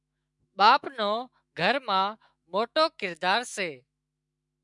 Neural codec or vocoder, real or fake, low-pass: autoencoder, 48 kHz, 128 numbers a frame, DAC-VAE, trained on Japanese speech; fake; 10.8 kHz